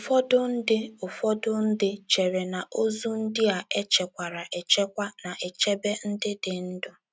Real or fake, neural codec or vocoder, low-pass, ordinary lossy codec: real; none; none; none